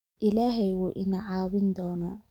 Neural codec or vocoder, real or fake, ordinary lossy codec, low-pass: codec, 44.1 kHz, 7.8 kbps, DAC; fake; none; 19.8 kHz